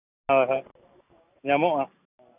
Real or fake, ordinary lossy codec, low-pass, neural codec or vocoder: real; none; 3.6 kHz; none